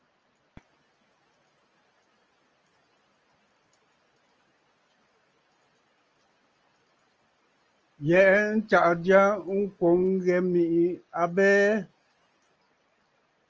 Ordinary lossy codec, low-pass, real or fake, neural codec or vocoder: Opus, 24 kbps; 7.2 kHz; real; none